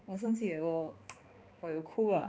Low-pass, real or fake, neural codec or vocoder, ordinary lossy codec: none; fake; codec, 16 kHz, 2 kbps, X-Codec, HuBERT features, trained on balanced general audio; none